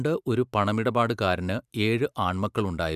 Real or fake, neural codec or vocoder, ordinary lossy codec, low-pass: fake; vocoder, 44.1 kHz, 128 mel bands every 512 samples, BigVGAN v2; none; 14.4 kHz